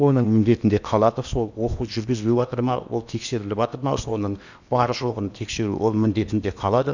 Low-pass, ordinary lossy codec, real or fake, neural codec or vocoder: 7.2 kHz; none; fake; codec, 16 kHz in and 24 kHz out, 0.8 kbps, FocalCodec, streaming, 65536 codes